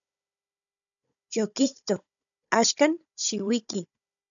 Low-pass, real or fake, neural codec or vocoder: 7.2 kHz; fake; codec, 16 kHz, 16 kbps, FunCodec, trained on Chinese and English, 50 frames a second